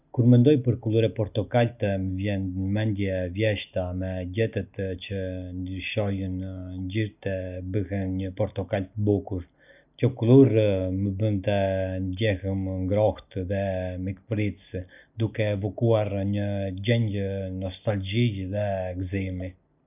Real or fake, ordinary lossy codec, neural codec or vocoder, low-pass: real; none; none; 3.6 kHz